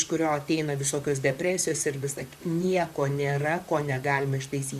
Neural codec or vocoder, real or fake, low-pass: vocoder, 44.1 kHz, 128 mel bands, Pupu-Vocoder; fake; 14.4 kHz